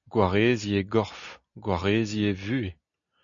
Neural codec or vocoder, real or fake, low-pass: none; real; 7.2 kHz